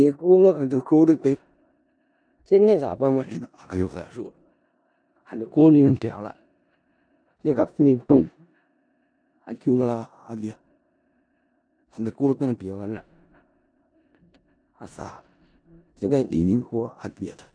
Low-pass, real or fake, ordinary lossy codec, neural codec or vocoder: 9.9 kHz; fake; MP3, 96 kbps; codec, 16 kHz in and 24 kHz out, 0.4 kbps, LongCat-Audio-Codec, four codebook decoder